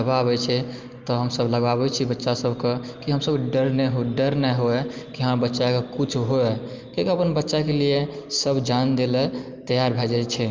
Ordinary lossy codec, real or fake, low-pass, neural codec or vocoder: Opus, 32 kbps; real; 7.2 kHz; none